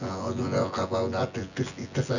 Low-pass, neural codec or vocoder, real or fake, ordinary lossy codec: 7.2 kHz; vocoder, 24 kHz, 100 mel bands, Vocos; fake; none